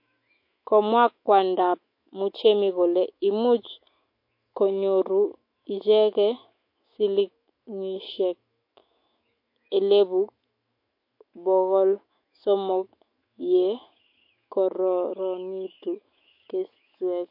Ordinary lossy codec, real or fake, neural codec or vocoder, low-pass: MP3, 32 kbps; fake; autoencoder, 48 kHz, 128 numbers a frame, DAC-VAE, trained on Japanese speech; 5.4 kHz